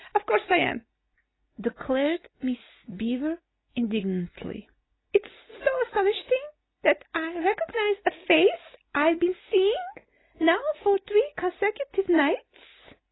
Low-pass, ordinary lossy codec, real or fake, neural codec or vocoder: 7.2 kHz; AAC, 16 kbps; real; none